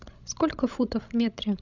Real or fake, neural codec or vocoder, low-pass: fake; codec, 16 kHz, 16 kbps, FreqCodec, larger model; 7.2 kHz